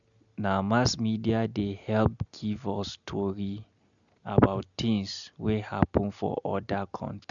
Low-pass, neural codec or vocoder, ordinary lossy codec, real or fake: 7.2 kHz; none; none; real